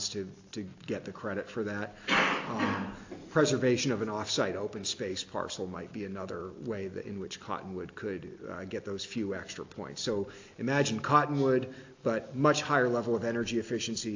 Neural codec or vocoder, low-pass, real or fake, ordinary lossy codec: none; 7.2 kHz; real; AAC, 48 kbps